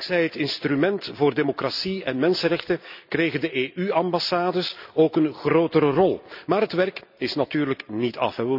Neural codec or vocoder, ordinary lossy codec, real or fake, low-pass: none; none; real; 5.4 kHz